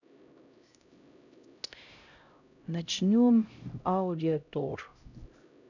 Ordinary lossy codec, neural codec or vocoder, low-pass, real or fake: none; codec, 16 kHz, 0.5 kbps, X-Codec, HuBERT features, trained on LibriSpeech; 7.2 kHz; fake